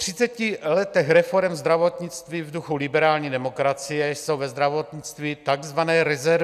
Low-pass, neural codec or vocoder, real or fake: 14.4 kHz; none; real